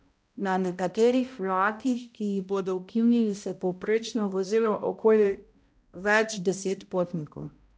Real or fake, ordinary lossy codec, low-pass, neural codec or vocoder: fake; none; none; codec, 16 kHz, 0.5 kbps, X-Codec, HuBERT features, trained on balanced general audio